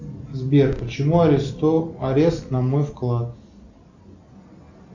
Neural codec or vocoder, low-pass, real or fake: none; 7.2 kHz; real